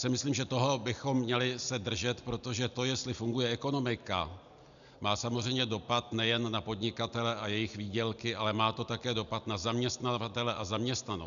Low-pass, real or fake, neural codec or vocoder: 7.2 kHz; real; none